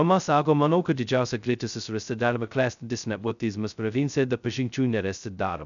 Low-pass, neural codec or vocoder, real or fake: 7.2 kHz; codec, 16 kHz, 0.2 kbps, FocalCodec; fake